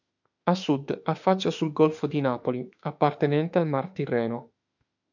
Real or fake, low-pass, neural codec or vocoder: fake; 7.2 kHz; autoencoder, 48 kHz, 32 numbers a frame, DAC-VAE, trained on Japanese speech